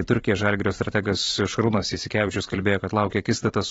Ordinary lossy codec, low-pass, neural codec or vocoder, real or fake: AAC, 24 kbps; 19.8 kHz; vocoder, 44.1 kHz, 128 mel bands every 512 samples, BigVGAN v2; fake